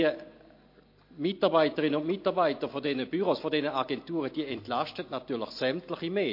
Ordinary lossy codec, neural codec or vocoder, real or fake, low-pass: MP3, 32 kbps; none; real; 5.4 kHz